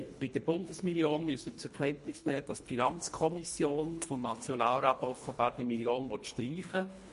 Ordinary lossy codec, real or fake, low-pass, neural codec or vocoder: MP3, 48 kbps; fake; 10.8 kHz; codec, 24 kHz, 1.5 kbps, HILCodec